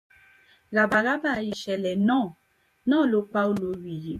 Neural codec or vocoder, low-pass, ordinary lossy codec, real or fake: vocoder, 48 kHz, 128 mel bands, Vocos; 14.4 kHz; MP3, 64 kbps; fake